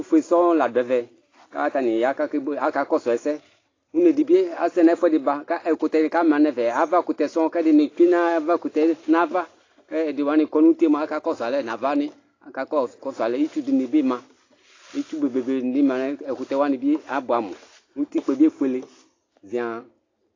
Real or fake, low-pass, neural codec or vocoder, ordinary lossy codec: real; 7.2 kHz; none; AAC, 32 kbps